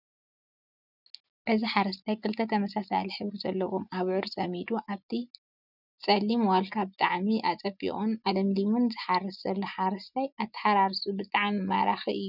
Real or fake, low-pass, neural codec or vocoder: real; 5.4 kHz; none